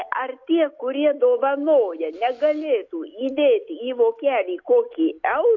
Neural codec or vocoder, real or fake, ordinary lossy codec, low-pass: none; real; AAC, 48 kbps; 7.2 kHz